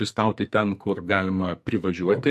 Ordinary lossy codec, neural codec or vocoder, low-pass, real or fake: MP3, 64 kbps; codec, 44.1 kHz, 2.6 kbps, SNAC; 14.4 kHz; fake